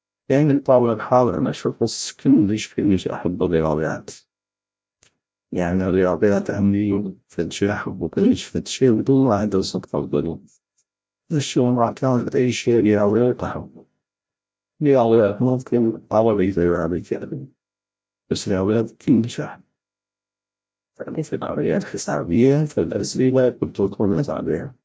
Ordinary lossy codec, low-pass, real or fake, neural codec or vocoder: none; none; fake; codec, 16 kHz, 0.5 kbps, FreqCodec, larger model